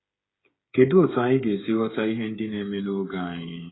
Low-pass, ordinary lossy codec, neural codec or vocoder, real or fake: 7.2 kHz; AAC, 16 kbps; codec, 16 kHz, 8 kbps, FreqCodec, smaller model; fake